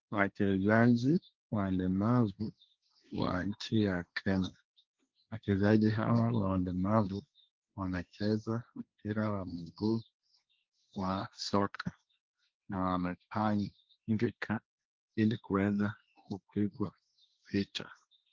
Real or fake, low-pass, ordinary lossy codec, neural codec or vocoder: fake; 7.2 kHz; Opus, 32 kbps; codec, 16 kHz, 1.1 kbps, Voila-Tokenizer